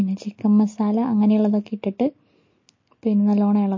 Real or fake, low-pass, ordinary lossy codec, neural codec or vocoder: real; 7.2 kHz; MP3, 32 kbps; none